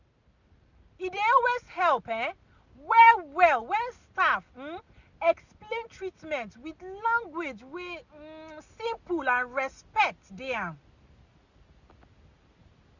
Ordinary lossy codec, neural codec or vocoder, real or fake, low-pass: none; none; real; 7.2 kHz